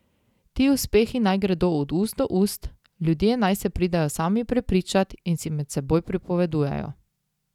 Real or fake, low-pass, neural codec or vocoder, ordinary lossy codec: real; 19.8 kHz; none; none